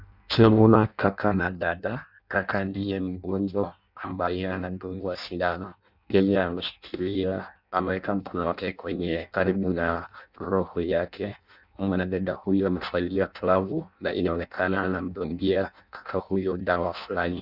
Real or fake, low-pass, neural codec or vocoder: fake; 5.4 kHz; codec, 16 kHz in and 24 kHz out, 0.6 kbps, FireRedTTS-2 codec